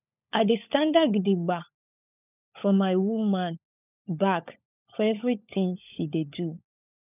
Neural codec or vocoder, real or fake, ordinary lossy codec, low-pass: codec, 16 kHz, 16 kbps, FunCodec, trained on LibriTTS, 50 frames a second; fake; none; 3.6 kHz